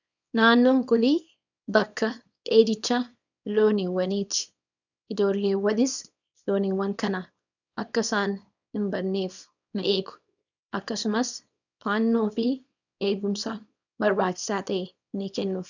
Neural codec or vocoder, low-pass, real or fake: codec, 24 kHz, 0.9 kbps, WavTokenizer, small release; 7.2 kHz; fake